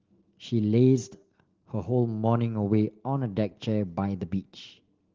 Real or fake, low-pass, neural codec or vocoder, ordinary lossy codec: real; 7.2 kHz; none; Opus, 16 kbps